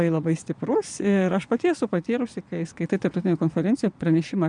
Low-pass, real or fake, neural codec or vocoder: 9.9 kHz; fake; vocoder, 22.05 kHz, 80 mel bands, Vocos